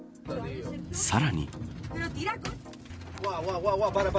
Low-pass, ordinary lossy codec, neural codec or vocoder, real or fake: none; none; none; real